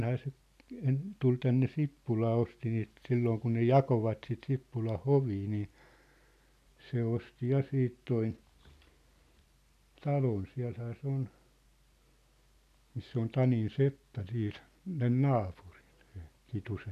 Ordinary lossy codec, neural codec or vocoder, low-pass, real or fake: none; none; 14.4 kHz; real